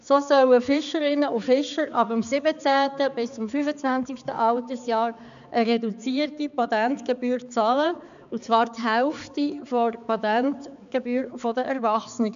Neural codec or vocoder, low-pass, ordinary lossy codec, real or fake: codec, 16 kHz, 4 kbps, X-Codec, HuBERT features, trained on balanced general audio; 7.2 kHz; AAC, 96 kbps; fake